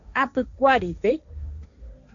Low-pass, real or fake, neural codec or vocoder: 7.2 kHz; fake; codec, 16 kHz, 1.1 kbps, Voila-Tokenizer